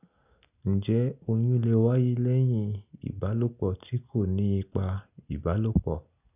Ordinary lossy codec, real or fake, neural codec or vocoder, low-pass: none; real; none; 3.6 kHz